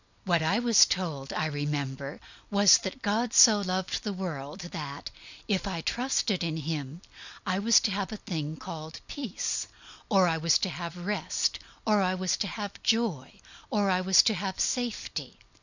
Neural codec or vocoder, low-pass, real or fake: none; 7.2 kHz; real